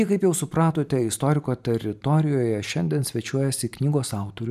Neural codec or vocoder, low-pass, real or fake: vocoder, 44.1 kHz, 128 mel bands every 512 samples, BigVGAN v2; 14.4 kHz; fake